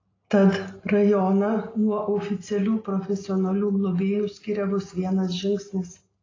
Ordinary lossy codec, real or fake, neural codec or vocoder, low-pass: AAC, 32 kbps; real; none; 7.2 kHz